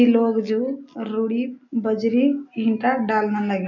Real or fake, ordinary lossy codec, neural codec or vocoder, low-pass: real; none; none; 7.2 kHz